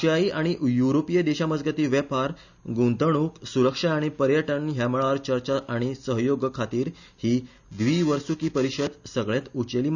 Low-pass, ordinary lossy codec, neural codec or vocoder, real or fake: 7.2 kHz; none; none; real